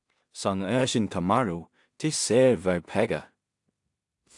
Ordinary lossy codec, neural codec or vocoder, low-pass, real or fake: AAC, 64 kbps; codec, 16 kHz in and 24 kHz out, 0.4 kbps, LongCat-Audio-Codec, two codebook decoder; 10.8 kHz; fake